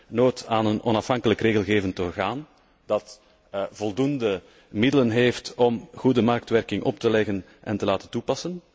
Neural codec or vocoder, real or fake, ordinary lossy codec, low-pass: none; real; none; none